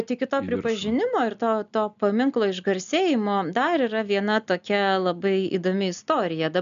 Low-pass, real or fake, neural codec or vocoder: 7.2 kHz; real; none